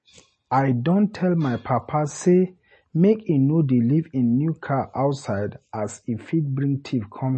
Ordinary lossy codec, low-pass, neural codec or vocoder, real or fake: MP3, 32 kbps; 10.8 kHz; none; real